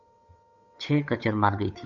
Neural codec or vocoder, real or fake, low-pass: codec, 16 kHz, 8 kbps, FunCodec, trained on Chinese and English, 25 frames a second; fake; 7.2 kHz